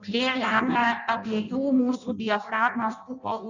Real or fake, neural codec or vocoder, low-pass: fake; codec, 16 kHz in and 24 kHz out, 0.6 kbps, FireRedTTS-2 codec; 7.2 kHz